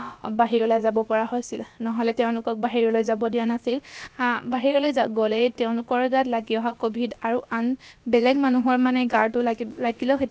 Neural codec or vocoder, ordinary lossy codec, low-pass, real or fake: codec, 16 kHz, about 1 kbps, DyCAST, with the encoder's durations; none; none; fake